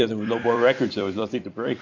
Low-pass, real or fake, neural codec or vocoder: 7.2 kHz; fake; vocoder, 44.1 kHz, 128 mel bands every 256 samples, BigVGAN v2